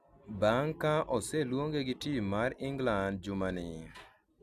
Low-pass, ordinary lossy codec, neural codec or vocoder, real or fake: 14.4 kHz; none; none; real